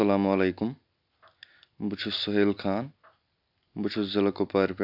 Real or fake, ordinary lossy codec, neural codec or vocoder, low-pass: real; AAC, 48 kbps; none; 5.4 kHz